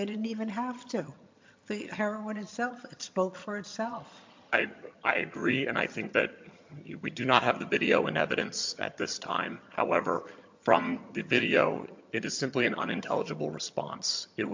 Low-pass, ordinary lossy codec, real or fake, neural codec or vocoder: 7.2 kHz; MP3, 48 kbps; fake; vocoder, 22.05 kHz, 80 mel bands, HiFi-GAN